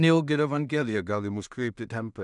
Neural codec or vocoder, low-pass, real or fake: codec, 16 kHz in and 24 kHz out, 0.4 kbps, LongCat-Audio-Codec, two codebook decoder; 10.8 kHz; fake